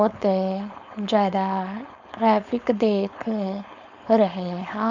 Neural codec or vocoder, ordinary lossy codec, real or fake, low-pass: codec, 16 kHz, 4.8 kbps, FACodec; none; fake; 7.2 kHz